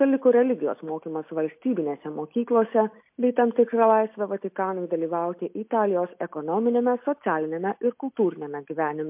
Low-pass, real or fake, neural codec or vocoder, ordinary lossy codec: 3.6 kHz; real; none; MP3, 32 kbps